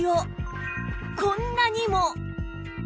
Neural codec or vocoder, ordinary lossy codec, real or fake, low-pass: none; none; real; none